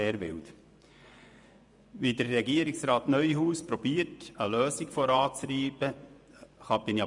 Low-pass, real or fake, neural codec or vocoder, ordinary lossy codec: 10.8 kHz; fake; vocoder, 24 kHz, 100 mel bands, Vocos; none